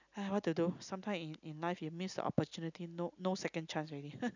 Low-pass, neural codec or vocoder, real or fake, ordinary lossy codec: 7.2 kHz; none; real; none